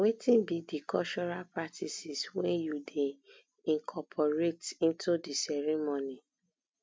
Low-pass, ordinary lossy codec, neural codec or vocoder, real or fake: none; none; none; real